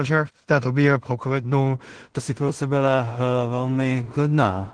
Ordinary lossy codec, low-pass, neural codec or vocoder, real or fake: Opus, 16 kbps; 9.9 kHz; codec, 16 kHz in and 24 kHz out, 0.4 kbps, LongCat-Audio-Codec, two codebook decoder; fake